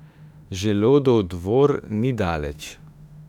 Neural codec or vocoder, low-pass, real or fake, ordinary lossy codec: autoencoder, 48 kHz, 32 numbers a frame, DAC-VAE, trained on Japanese speech; 19.8 kHz; fake; none